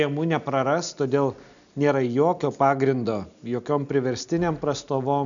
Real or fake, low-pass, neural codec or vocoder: real; 7.2 kHz; none